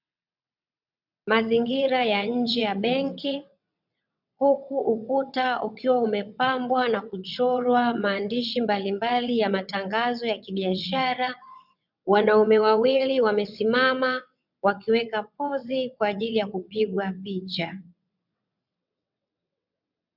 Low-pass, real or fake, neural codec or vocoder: 5.4 kHz; fake; vocoder, 22.05 kHz, 80 mel bands, WaveNeXt